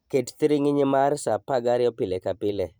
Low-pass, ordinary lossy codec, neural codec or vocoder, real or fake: none; none; vocoder, 44.1 kHz, 128 mel bands every 512 samples, BigVGAN v2; fake